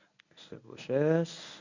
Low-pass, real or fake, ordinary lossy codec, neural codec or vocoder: 7.2 kHz; fake; none; codec, 24 kHz, 0.9 kbps, WavTokenizer, medium speech release version 1